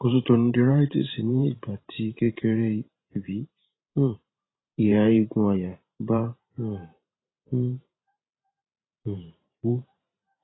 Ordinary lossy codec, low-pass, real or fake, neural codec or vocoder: AAC, 16 kbps; 7.2 kHz; fake; vocoder, 44.1 kHz, 128 mel bands every 512 samples, BigVGAN v2